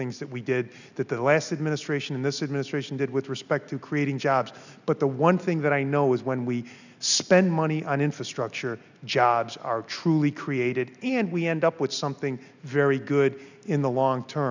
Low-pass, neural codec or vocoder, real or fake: 7.2 kHz; none; real